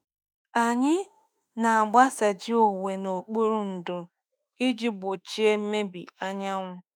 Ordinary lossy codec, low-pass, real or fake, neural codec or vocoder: none; none; fake; autoencoder, 48 kHz, 32 numbers a frame, DAC-VAE, trained on Japanese speech